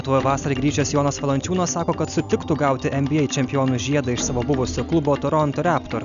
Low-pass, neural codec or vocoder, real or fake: 7.2 kHz; none; real